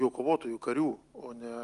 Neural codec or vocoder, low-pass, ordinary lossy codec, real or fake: none; 10.8 kHz; Opus, 32 kbps; real